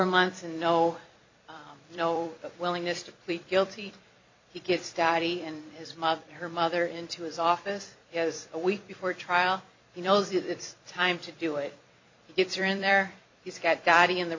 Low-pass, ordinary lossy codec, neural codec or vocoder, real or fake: 7.2 kHz; MP3, 64 kbps; vocoder, 44.1 kHz, 128 mel bands every 256 samples, BigVGAN v2; fake